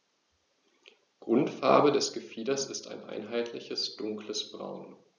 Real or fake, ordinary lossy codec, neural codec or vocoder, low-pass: real; none; none; 7.2 kHz